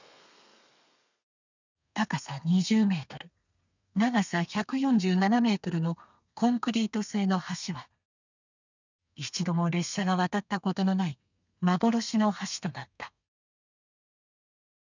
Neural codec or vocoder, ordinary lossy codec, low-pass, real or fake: codec, 32 kHz, 1.9 kbps, SNAC; none; 7.2 kHz; fake